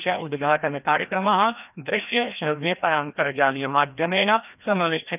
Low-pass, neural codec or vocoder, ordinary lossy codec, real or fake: 3.6 kHz; codec, 16 kHz, 1 kbps, FreqCodec, larger model; none; fake